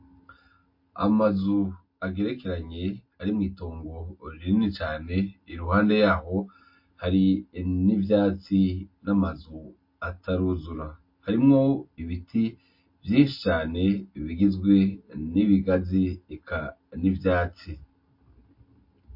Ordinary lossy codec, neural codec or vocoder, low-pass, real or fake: MP3, 32 kbps; none; 5.4 kHz; real